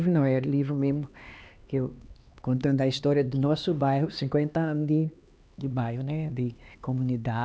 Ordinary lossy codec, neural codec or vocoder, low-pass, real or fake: none; codec, 16 kHz, 2 kbps, X-Codec, HuBERT features, trained on LibriSpeech; none; fake